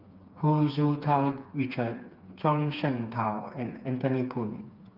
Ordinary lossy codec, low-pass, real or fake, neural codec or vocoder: Opus, 24 kbps; 5.4 kHz; fake; codec, 16 kHz, 4 kbps, FreqCodec, smaller model